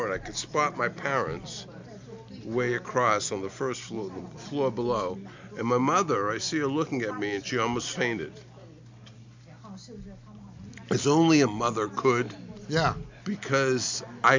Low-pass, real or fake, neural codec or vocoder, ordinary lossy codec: 7.2 kHz; real; none; MP3, 64 kbps